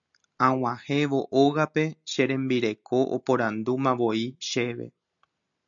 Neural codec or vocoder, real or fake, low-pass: none; real; 7.2 kHz